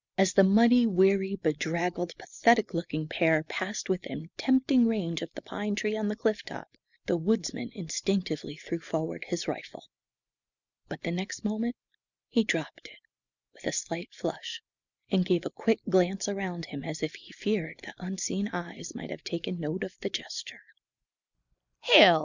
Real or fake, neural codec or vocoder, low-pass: real; none; 7.2 kHz